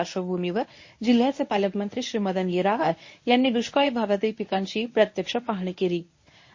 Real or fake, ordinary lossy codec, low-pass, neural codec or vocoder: fake; MP3, 32 kbps; 7.2 kHz; codec, 24 kHz, 0.9 kbps, WavTokenizer, medium speech release version 1